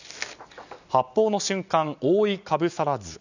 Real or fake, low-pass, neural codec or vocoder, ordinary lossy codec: real; 7.2 kHz; none; none